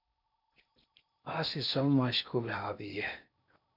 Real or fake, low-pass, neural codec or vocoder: fake; 5.4 kHz; codec, 16 kHz in and 24 kHz out, 0.6 kbps, FocalCodec, streaming, 4096 codes